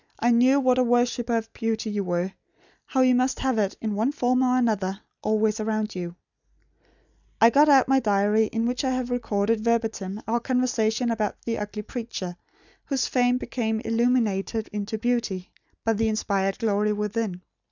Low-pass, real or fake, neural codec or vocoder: 7.2 kHz; real; none